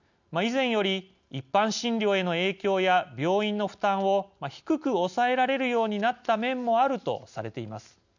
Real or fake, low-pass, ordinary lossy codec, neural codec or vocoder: real; 7.2 kHz; none; none